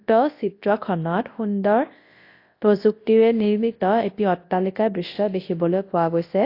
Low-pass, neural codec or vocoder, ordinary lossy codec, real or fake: 5.4 kHz; codec, 24 kHz, 0.9 kbps, WavTokenizer, large speech release; AAC, 32 kbps; fake